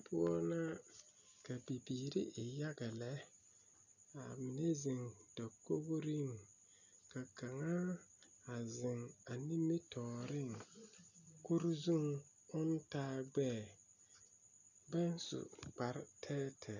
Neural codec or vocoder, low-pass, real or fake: none; 7.2 kHz; real